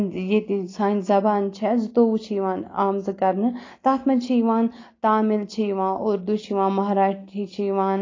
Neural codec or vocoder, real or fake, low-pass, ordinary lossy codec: none; real; 7.2 kHz; AAC, 32 kbps